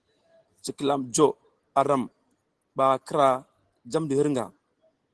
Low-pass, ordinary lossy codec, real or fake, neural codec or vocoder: 10.8 kHz; Opus, 16 kbps; real; none